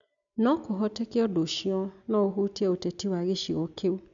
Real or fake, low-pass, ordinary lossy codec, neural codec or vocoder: real; 7.2 kHz; none; none